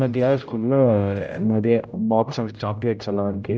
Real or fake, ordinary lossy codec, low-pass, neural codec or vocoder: fake; none; none; codec, 16 kHz, 0.5 kbps, X-Codec, HuBERT features, trained on general audio